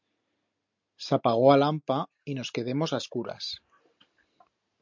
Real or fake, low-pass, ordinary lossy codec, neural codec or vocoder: real; 7.2 kHz; MP3, 64 kbps; none